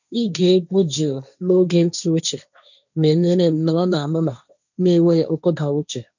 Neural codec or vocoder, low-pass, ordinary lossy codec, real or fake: codec, 16 kHz, 1.1 kbps, Voila-Tokenizer; 7.2 kHz; none; fake